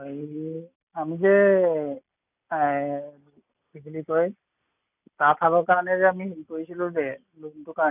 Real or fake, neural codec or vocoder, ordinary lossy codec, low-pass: real; none; none; 3.6 kHz